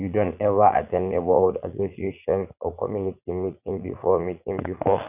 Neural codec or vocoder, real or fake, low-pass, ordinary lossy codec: vocoder, 44.1 kHz, 80 mel bands, Vocos; fake; 3.6 kHz; none